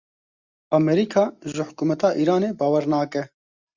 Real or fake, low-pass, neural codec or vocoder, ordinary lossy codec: real; 7.2 kHz; none; Opus, 64 kbps